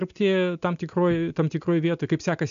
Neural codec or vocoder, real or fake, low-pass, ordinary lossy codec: none; real; 7.2 kHz; MP3, 64 kbps